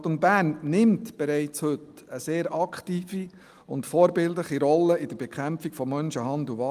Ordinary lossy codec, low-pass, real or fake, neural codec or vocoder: Opus, 32 kbps; 14.4 kHz; real; none